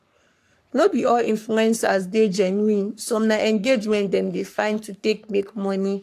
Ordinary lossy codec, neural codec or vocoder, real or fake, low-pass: AAC, 64 kbps; codec, 44.1 kHz, 3.4 kbps, Pupu-Codec; fake; 14.4 kHz